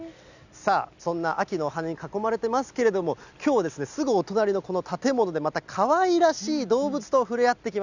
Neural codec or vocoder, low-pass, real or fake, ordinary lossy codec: none; 7.2 kHz; real; none